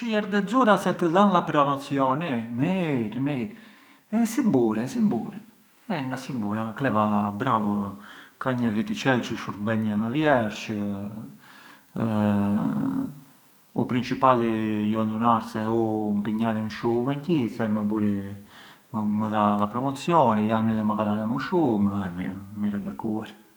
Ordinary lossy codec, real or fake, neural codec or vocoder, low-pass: none; fake; codec, 44.1 kHz, 2.6 kbps, SNAC; none